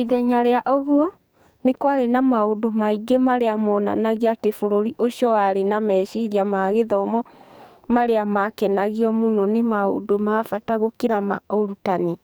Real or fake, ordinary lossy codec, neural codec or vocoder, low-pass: fake; none; codec, 44.1 kHz, 2.6 kbps, SNAC; none